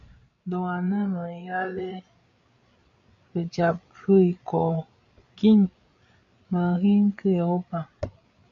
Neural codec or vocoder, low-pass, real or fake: codec, 16 kHz, 8 kbps, FreqCodec, larger model; 7.2 kHz; fake